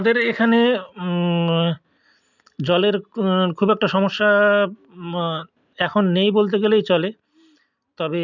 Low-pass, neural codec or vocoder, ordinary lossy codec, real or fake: 7.2 kHz; none; none; real